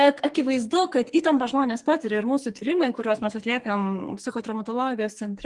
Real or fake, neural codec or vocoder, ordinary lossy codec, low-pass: fake; codec, 44.1 kHz, 2.6 kbps, SNAC; Opus, 24 kbps; 10.8 kHz